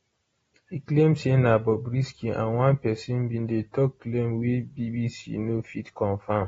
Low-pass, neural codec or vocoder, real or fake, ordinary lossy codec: 19.8 kHz; none; real; AAC, 24 kbps